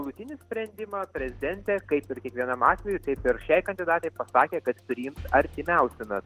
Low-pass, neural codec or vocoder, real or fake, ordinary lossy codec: 14.4 kHz; none; real; Opus, 16 kbps